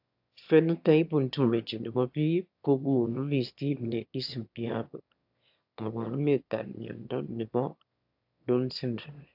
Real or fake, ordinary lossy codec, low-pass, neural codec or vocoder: fake; AAC, 48 kbps; 5.4 kHz; autoencoder, 22.05 kHz, a latent of 192 numbers a frame, VITS, trained on one speaker